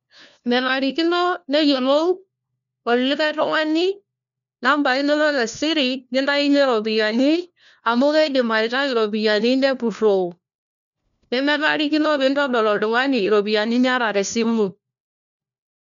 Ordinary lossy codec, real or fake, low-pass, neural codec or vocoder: none; fake; 7.2 kHz; codec, 16 kHz, 1 kbps, FunCodec, trained on LibriTTS, 50 frames a second